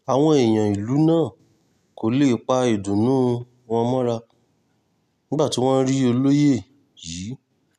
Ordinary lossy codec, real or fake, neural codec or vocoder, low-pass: none; real; none; 14.4 kHz